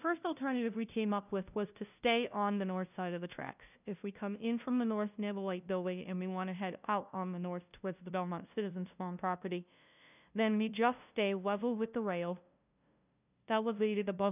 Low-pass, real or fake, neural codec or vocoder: 3.6 kHz; fake; codec, 16 kHz, 0.5 kbps, FunCodec, trained on LibriTTS, 25 frames a second